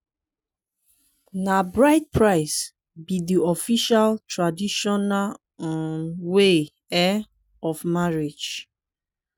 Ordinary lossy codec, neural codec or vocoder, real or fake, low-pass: none; none; real; none